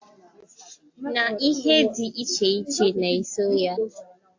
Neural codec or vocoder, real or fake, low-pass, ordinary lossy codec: none; real; 7.2 kHz; AAC, 48 kbps